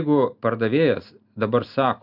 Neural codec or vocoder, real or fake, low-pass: none; real; 5.4 kHz